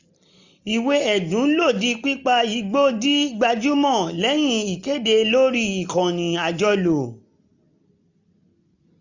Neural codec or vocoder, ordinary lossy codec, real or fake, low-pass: none; none; real; 7.2 kHz